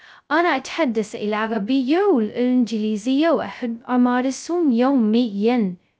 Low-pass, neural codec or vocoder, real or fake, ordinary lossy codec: none; codec, 16 kHz, 0.2 kbps, FocalCodec; fake; none